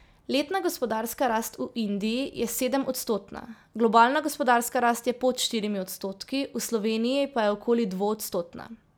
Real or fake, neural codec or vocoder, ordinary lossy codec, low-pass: real; none; none; none